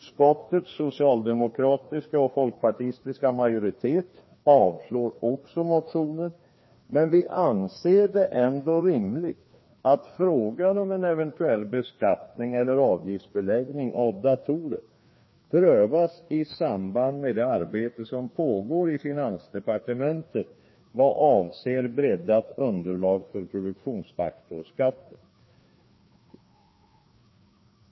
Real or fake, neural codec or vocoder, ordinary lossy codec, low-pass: fake; codec, 16 kHz, 2 kbps, FreqCodec, larger model; MP3, 24 kbps; 7.2 kHz